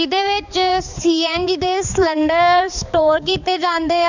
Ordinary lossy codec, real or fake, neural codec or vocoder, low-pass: none; fake; codec, 16 kHz, 4 kbps, X-Codec, HuBERT features, trained on balanced general audio; 7.2 kHz